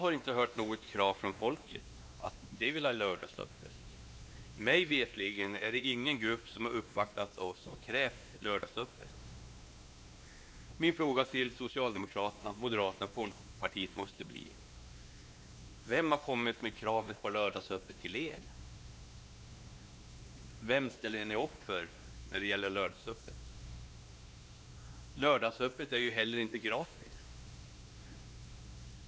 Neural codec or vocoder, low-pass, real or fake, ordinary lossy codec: codec, 16 kHz, 2 kbps, X-Codec, WavLM features, trained on Multilingual LibriSpeech; none; fake; none